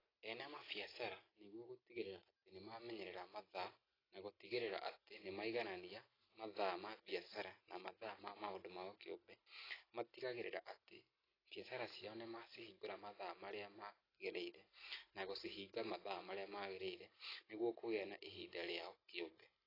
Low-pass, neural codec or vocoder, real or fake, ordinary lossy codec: 5.4 kHz; none; real; AAC, 24 kbps